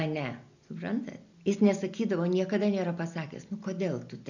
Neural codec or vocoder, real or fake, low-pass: none; real; 7.2 kHz